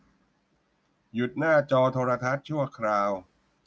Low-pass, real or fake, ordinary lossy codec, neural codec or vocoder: none; real; none; none